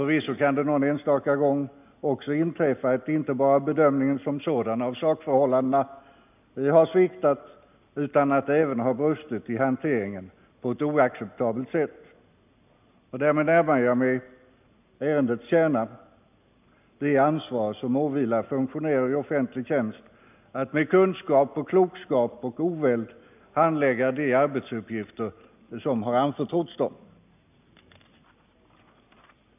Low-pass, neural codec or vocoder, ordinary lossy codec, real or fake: 3.6 kHz; none; none; real